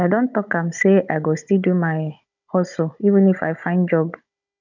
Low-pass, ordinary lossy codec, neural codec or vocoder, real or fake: 7.2 kHz; none; codec, 16 kHz, 16 kbps, FunCodec, trained on Chinese and English, 50 frames a second; fake